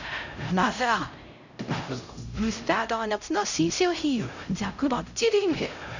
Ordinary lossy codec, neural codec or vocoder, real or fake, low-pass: Opus, 64 kbps; codec, 16 kHz, 0.5 kbps, X-Codec, HuBERT features, trained on LibriSpeech; fake; 7.2 kHz